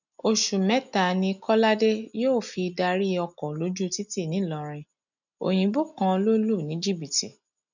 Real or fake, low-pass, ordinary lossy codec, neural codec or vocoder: real; 7.2 kHz; none; none